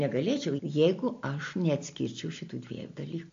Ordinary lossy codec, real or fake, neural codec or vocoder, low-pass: AAC, 48 kbps; real; none; 7.2 kHz